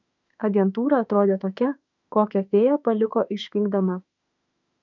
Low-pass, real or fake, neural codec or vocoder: 7.2 kHz; fake; autoencoder, 48 kHz, 32 numbers a frame, DAC-VAE, trained on Japanese speech